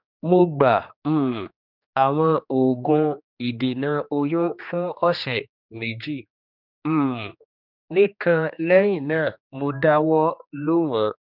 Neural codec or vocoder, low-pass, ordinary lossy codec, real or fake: codec, 16 kHz, 2 kbps, X-Codec, HuBERT features, trained on general audio; 5.4 kHz; none; fake